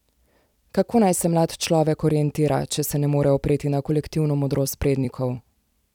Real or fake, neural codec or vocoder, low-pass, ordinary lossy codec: fake; vocoder, 44.1 kHz, 128 mel bands every 256 samples, BigVGAN v2; 19.8 kHz; none